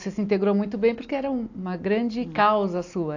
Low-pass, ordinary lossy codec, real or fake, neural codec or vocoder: 7.2 kHz; AAC, 48 kbps; real; none